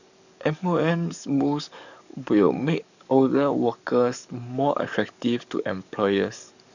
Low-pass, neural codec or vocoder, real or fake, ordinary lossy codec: 7.2 kHz; codec, 44.1 kHz, 7.8 kbps, DAC; fake; none